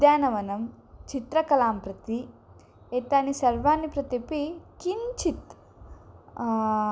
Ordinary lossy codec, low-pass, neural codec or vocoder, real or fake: none; none; none; real